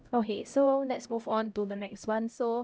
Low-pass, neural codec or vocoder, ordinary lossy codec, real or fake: none; codec, 16 kHz, 0.5 kbps, X-Codec, HuBERT features, trained on LibriSpeech; none; fake